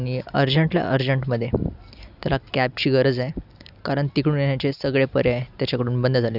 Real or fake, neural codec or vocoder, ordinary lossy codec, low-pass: real; none; none; 5.4 kHz